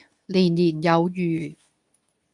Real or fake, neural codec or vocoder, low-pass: fake; codec, 24 kHz, 0.9 kbps, WavTokenizer, medium speech release version 2; 10.8 kHz